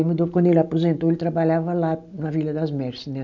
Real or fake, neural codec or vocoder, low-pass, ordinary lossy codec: real; none; 7.2 kHz; none